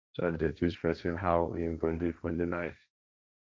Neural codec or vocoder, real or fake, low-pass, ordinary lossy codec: codec, 16 kHz, 1.1 kbps, Voila-Tokenizer; fake; none; none